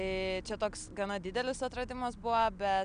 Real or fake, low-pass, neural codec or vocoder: real; 9.9 kHz; none